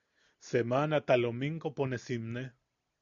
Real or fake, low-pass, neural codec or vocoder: real; 7.2 kHz; none